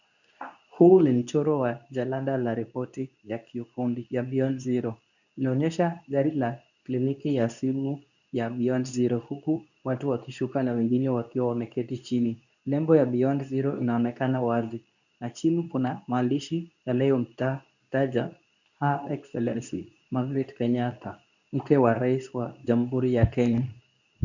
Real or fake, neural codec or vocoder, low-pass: fake; codec, 24 kHz, 0.9 kbps, WavTokenizer, medium speech release version 2; 7.2 kHz